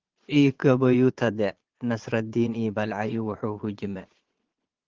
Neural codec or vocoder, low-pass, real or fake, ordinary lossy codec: vocoder, 22.05 kHz, 80 mel bands, Vocos; 7.2 kHz; fake; Opus, 16 kbps